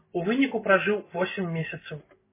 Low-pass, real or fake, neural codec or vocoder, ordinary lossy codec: 3.6 kHz; real; none; MP3, 16 kbps